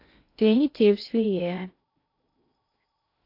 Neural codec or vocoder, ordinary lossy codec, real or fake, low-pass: codec, 16 kHz in and 24 kHz out, 0.6 kbps, FocalCodec, streaming, 2048 codes; AAC, 32 kbps; fake; 5.4 kHz